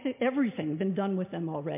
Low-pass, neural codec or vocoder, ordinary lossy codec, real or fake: 3.6 kHz; none; MP3, 32 kbps; real